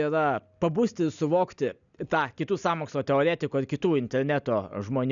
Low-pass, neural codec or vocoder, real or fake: 7.2 kHz; none; real